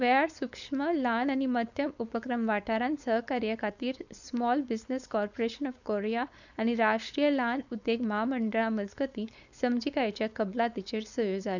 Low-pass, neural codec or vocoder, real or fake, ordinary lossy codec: 7.2 kHz; codec, 16 kHz, 4.8 kbps, FACodec; fake; none